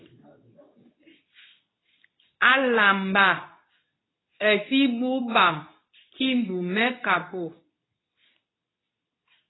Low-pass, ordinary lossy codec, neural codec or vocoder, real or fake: 7.2 kHz; AAC, 16 kbps; codec, 24 kHz, 0.9 kbps, WavTokenizer, medium speech release version 2; fake